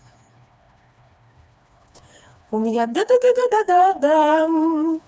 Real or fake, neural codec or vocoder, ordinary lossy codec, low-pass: fake; codec, 16 kHz, 2 kbps, FreqCodec, smaller model; none; none